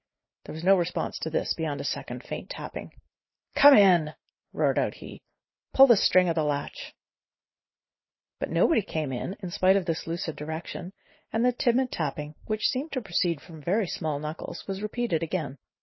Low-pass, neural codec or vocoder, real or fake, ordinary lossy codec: 7.2 kHz; none; real; MP3, 24 kbps